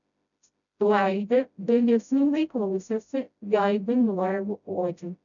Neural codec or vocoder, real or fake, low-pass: codec, 16 kHz, 0.5 kbps, FreqCodec, smaller model; fake; 7.2 kHz